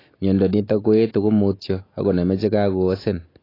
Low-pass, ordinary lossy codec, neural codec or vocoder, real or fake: 5.4 kHz; AAC, 24 kbps; none; real